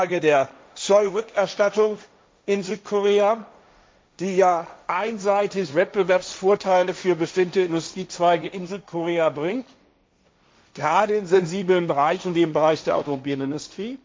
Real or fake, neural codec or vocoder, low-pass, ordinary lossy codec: fake; codec, 16 kHz, 1.1 kbps, Voila-Tokenizer; none; none